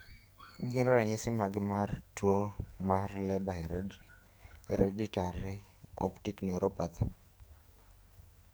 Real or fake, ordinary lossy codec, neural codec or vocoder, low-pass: fake; none; codec, 44.1 kHz, 2.6 kbps, SNAC; none